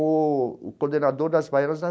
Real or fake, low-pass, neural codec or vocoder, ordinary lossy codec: real; none; none; none